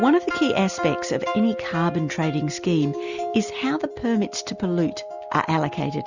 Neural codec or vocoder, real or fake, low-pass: none; real; 7.2 kHz